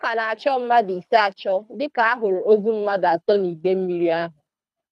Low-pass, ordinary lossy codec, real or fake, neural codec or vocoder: none; none; fake; codec, 24 kHz, 3 kbps, HILCodec